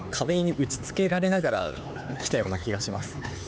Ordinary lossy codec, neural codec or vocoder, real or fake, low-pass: none; codec, 16 kHz, 4 kbps, X-Codec, HuBERT features, trained on LibriSpeech; fake; none